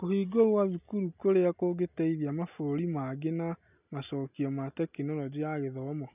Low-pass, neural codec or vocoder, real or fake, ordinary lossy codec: 3.6 kHz; none; real; none